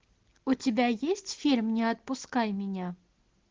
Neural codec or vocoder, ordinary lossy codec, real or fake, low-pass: none; Opus, 16 kbps; real; 7.2 kHz